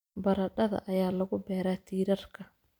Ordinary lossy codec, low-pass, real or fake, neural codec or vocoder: none; none; fake; vocoder, 44.1 kHz, 128 mel bands every 256 samples, BigVGAN v2